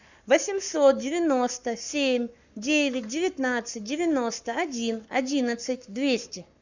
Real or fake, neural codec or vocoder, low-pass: fake; codec, 44.1 kHz, 7.8 kbps, Pupu-Codec; 7.2 kHz